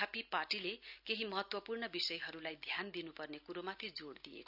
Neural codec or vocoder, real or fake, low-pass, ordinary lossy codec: none; real; 5.4 kHz; none